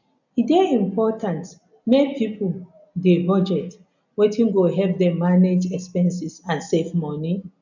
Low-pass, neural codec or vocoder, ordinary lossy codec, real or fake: 7.2 kHz; none; none; real